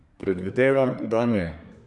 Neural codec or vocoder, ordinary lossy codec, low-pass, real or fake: codec, 24 kHz, 1 kbps, SNAC; none; 10.8 kHz; fake